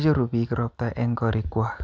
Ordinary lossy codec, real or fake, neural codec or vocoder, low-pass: none; real; none; none